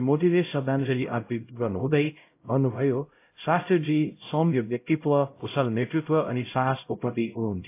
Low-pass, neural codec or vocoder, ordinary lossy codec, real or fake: 3.6 kHz; codec, 16 kHz, 0.5 kbps, X-Codec, HuBERT features, trained on LibriSpeech; AAC, 24 kbps; fake